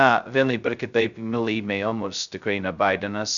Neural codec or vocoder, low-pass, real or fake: codec, 16 kHz, 0.2 kbps, FocalCodec; 7.2 kHz; fake